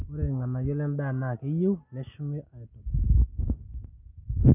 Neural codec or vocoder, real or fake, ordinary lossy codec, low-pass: none; real; none; 3.6 kHz